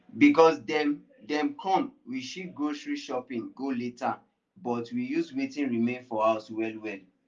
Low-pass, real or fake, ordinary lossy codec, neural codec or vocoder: 7.2 kHz; real; Opus, 32 kbps; none